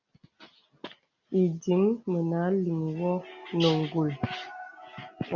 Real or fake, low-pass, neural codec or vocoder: real; 7.2 kHz; none